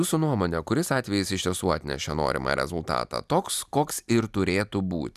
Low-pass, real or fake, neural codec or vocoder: 14.4 kHz; real; none